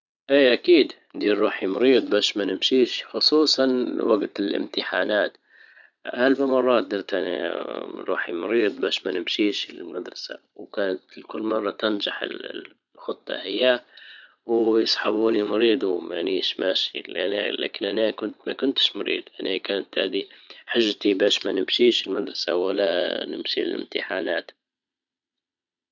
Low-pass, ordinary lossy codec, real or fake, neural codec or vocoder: 7.2 kHz; none; fake; vocoder, 22.05 kHz, 80 mel bands, Vocos